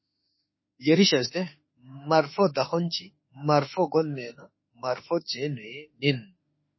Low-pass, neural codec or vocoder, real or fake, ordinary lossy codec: 7.2 kHz; autoencoder, 48 kHz, 32 numbers a frame, DAC-VAE, trained on Japanese speech; fake; MP3, 24 kbps